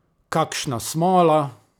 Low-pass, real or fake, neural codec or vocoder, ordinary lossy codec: none; real; none; none